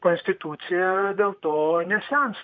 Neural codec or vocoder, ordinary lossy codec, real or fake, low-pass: vocoder, 44.1 kHz, 128 mel bands, Pupu-Vocoder; MP3, 48 kbps; fake; 7.2 kHz